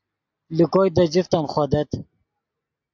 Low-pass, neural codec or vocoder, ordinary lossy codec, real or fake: 7.2 kHz; none; AAC, 48 kbps; real